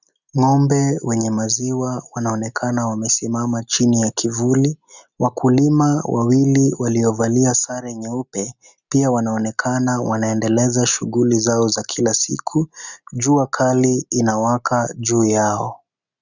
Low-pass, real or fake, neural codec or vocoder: 7.2 kHz; real; none